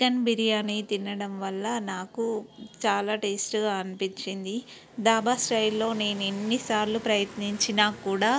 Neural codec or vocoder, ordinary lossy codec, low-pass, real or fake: none; none; none; real